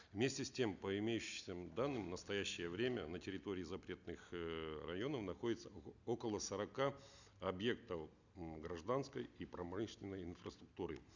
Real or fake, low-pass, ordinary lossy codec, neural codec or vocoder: real; 7.2 kHz; none; none